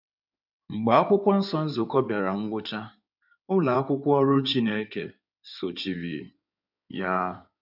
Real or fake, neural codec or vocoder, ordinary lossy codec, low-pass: fake; codec, 16 kHz in and 24 kHz out, 2.2 kbps, FireRedTTS-2 codec; none; 5.4 kHz